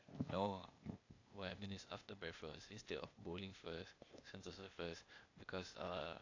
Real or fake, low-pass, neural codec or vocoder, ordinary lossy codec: fake; 7.2 kHz; codec, 16 kHz, 0.8 kbps, ZipCodec; AAC, 48 kbps